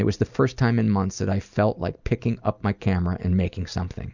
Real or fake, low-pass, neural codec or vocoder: real; 7.2 kHz; none